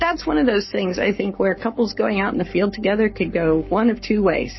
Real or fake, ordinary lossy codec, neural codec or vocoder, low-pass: fake; MP3, 24 kbps; codec, 44.1 kHz, 7.8 kbps, Pupu-Codec; 7.2 kHz